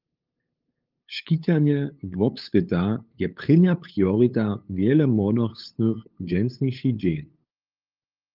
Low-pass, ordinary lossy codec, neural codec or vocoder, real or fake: 5.4 kHz; Opus, 32 kbps; codec, 16 kHz, 8 kbps, FunCodec, trained on LibriTTS, 25 frames a second; fake